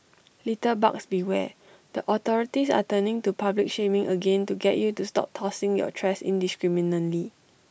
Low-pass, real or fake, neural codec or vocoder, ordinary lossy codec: none; real; none; none